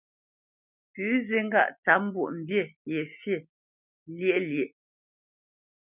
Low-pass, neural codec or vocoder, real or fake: 3.6 kHz; none; real